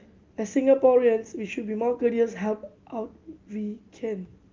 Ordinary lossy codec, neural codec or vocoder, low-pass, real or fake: Opus, 24 kbps; none; 7.2 kHz; real